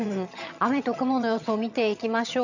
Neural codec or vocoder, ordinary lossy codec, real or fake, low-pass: vocoder, 22.05 kHz, 80 mel bands, HiFi-GAN; none; fake; 7.2 kHz